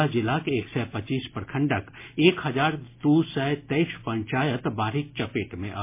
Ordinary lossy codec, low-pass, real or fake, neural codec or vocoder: MP3, 24 kbps; 3.6 kHz; real; none